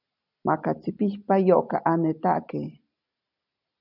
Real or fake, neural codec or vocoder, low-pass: real; none; 5.4 kHz